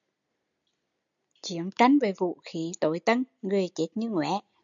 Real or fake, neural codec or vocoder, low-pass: real; none; 7.2 kHz